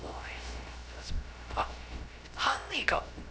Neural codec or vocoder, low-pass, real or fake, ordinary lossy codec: codec, 16 kHz, 0.3 kbps, FocalCodec; none; fake; none